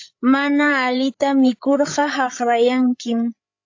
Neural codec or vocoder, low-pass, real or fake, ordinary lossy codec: codec, 16 kHz, 8 kbps, FreqCodec, larger model; 7.2 kHz; fake; AAC, 48 kbps